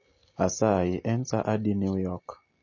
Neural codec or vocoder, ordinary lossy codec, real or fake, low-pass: none; MP3, 32 kbps; real; 7.2 kHz